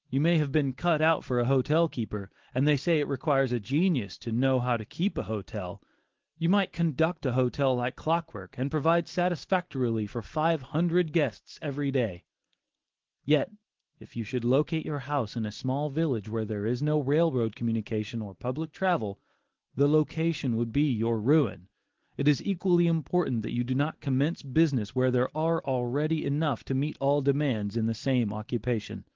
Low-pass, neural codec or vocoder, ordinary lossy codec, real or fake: 7.2 kHz; none; Opus, 16 kbps; real